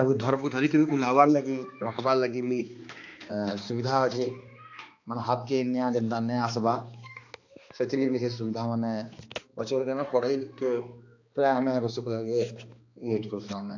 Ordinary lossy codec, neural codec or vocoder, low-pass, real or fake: AAC, 48 kbps; codec, 16 kHz, 2 kbps, X-Codec, HuBERT features, trained on balanced general audio; 7.2 kHz; fake